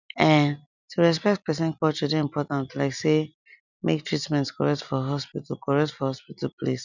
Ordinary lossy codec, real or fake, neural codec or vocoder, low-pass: none; real; none; 7.2 kHz